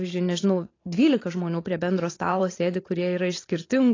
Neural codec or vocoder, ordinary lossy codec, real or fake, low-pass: vocoder, 44.1 kHz, 128 mel bands every 512 samples, BigVGAN v2; AAC, 32 kbps; fake; 7.2 kHz